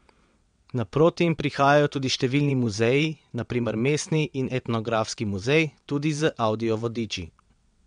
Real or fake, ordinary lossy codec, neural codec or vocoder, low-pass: fake; MP3, 64 kbps; vocoder, 22.05 kHz, 80 mel bands, WaveNeXt; 9.9 kHz